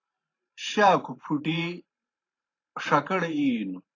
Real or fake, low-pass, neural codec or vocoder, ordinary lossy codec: fake; 7.2 kHz; vocoder, 44.1 kHz, 128 mel bands every 512 samples, BigVGAN v2; AAC, 32 kbps